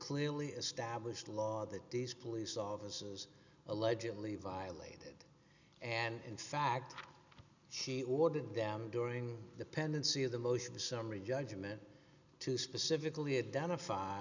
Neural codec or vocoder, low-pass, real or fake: none; 7.2 kHz; real